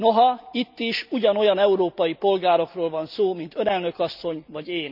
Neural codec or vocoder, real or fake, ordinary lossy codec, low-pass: none; real; none; 5.4 kHz